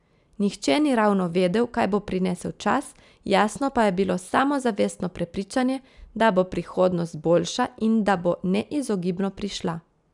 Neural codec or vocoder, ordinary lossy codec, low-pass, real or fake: none; none; 10.8 kHz; real